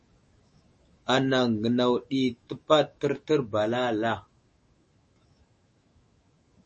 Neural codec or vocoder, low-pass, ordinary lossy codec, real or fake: autoencoder, 48 kHz, 128 numbers a frame, DAC-VAE, trained on Japanese speech; 10.8 kHz; MP3, 32 kbps; fake